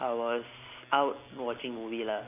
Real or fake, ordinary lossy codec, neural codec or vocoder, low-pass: real; none; none; 3.6 kHz